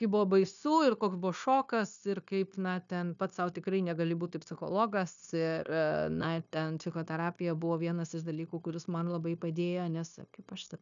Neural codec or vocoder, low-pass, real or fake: codec, 16 kHz, 0.9 kbps, LongCat-Audio-Codec; 7.2 kHz; fake